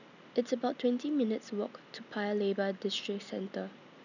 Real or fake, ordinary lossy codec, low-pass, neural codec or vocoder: real; none; 7.2 kHz; none